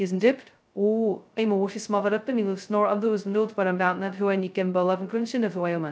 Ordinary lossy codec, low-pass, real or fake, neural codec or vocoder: none; none; fake; codec, 16 kHz, 0.2 kbps, FocalCodec